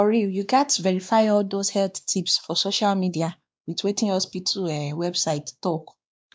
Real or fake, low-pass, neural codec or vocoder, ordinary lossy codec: fake; none; codec, 16 kHz, 2 kbps, X-Codec, WavLM features, trained on Multilingual LibriSpeech; none